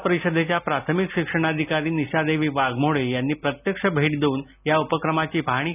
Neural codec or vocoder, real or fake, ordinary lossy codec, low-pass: none; real; none; 3.6 kHz